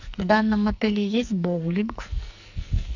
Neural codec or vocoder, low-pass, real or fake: codec, 44.1 kHz, 2.6 kbps, SNAC; 7.2 kHz; fake